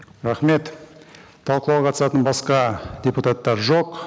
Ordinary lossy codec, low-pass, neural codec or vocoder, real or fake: none; none; none; real